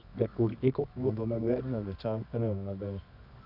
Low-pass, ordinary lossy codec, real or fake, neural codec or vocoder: 5.4 kHz; none; fake; codec, 24 kHz, 0.9 kbps, WavTokenizer, medium music audio release